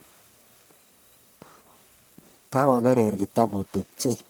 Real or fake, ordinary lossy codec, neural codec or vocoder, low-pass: fake; none; codec, 44.1 kHz, 1.7 kbps, Pupu-Codec; none